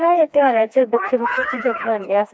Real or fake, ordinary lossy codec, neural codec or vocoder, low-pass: fake; none; codec, 16 kHz, 2 kbps, FreqCodec, smaller model; none